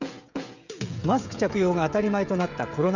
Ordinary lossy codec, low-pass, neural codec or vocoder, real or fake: none; 7.2 kHz; codec, 16 kHz, 16 kbps, FreqCodec, smaller model; fake